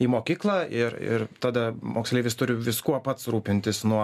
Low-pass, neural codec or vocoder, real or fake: 14.4 kHz; none; real